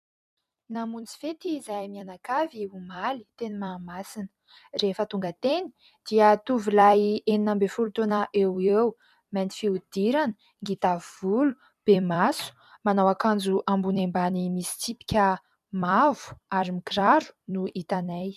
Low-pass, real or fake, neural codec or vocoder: 14.4 kHz; fake; vocoder, 44.1 kHz, 128 mel bands every 256 samples, BigVGAN v2